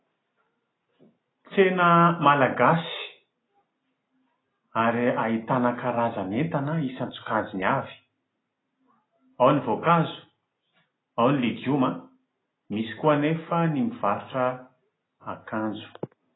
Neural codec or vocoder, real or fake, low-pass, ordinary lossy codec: none; real; 7.2 kHz; AAC, 16 kbps